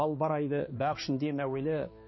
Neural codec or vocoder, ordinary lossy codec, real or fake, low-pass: codec, 16 kHz, 2 kbps, X-Codec, HuBERT features, trained on balanced general audio; MP3, 24 kbps; fake; 7.2 kHz